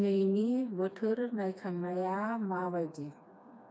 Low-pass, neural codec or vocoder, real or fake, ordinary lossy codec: none; codec, 16 kHz, 2 kbps, FreqCodec, smaller model; fake; none